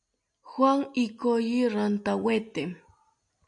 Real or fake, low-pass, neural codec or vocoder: real; 9.9 kHz; none